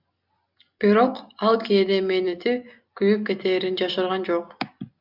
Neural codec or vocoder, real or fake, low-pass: none; real; 5.4 kHz